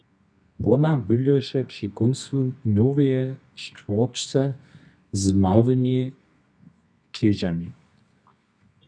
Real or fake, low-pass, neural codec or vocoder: fake; 9.9 kHz; codec, 24 kHz, 0.9 kbps, WavTokenizer, medium music audio release